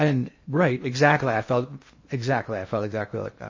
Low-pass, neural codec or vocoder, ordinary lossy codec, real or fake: 7.2 kHz; codec, 16 kHz in and 24 kHz out, 0.6 kbps, FocalCodec, streaming, 2048 codes; MP3, 32 kbps; fake